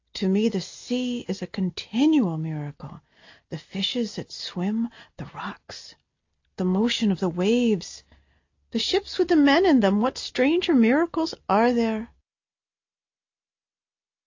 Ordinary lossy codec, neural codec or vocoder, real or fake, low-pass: AAC, 48 kbps; none; real; 7.2 kHz